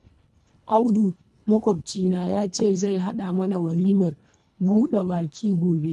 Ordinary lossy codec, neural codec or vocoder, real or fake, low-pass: none; codec, 24 kHz, 1.5 kbps, HILCodec; fake; none